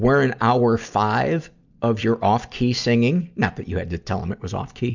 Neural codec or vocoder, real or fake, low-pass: none; real; 7.2 kHz